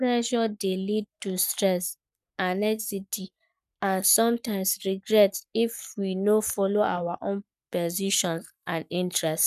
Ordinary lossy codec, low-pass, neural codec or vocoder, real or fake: none; 14.4 kHz; codec, 44.1 kHz, 7.8 kbps, Pupu-Codec; fake